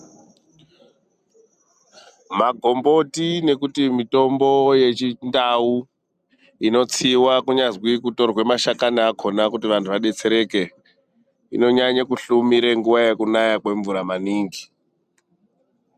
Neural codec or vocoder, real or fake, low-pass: none; real; 14.4 kHz